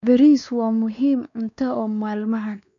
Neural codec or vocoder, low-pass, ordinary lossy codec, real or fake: codec, 16 kHz, 2 kbps, X-Codec, WavLM features, trained on Multilingual LibriSpeech; 7.2 kHz; none; fake